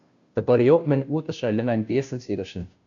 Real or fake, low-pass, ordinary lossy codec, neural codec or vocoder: fake; 7.2 kHz; MP3, 96 kbps; codec, 16 kHz, 0.5 kbps, FunCodec, trained on Chinese and English, 25 frames a second